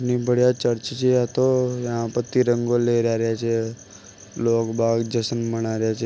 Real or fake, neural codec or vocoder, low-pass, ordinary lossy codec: real; none; none; none